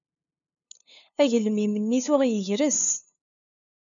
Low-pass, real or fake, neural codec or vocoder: 7.2 kHz; fake; codec, 16 kHz, 2 kbps, FunCodec, trained on LibriTTS, 25 frames a second